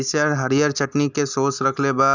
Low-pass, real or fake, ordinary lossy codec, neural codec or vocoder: 7.2 kHz; real; none; none